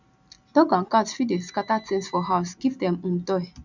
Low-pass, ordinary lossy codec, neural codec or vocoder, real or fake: 7.2 kHz; none; none; real